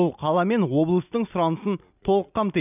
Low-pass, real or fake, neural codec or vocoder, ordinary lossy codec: 3.6 kHz; real; none; none